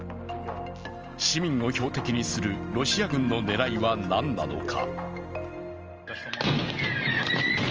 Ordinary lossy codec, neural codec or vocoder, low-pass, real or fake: Opus, 24 kbps; none; 7.2 kHz; real